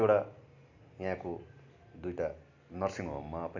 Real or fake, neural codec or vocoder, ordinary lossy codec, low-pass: real; none; none; 7.2 kHz